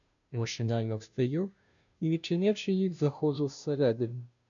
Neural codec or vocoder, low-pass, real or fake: codec, 16 kHz, 0.5 kbps, FunCodec, trained on Chinese and English, 25 frames a second; 7.2 kHz; fake